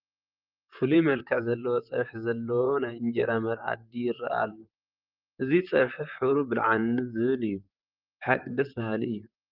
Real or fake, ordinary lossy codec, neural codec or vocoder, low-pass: fake; Opus, 32 kbps; vocoder, 44.1 kHz, 128 mel bands, Pupu-Vocoder; 5.4 kHz